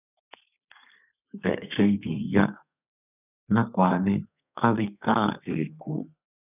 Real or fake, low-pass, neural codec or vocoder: fake; 3.6 kHz; codec, 32 kHz, 1.9 kbps, SNAC